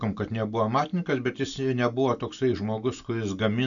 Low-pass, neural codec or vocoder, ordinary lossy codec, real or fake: 7.2 kHz; none; MP3, 96 kbps; real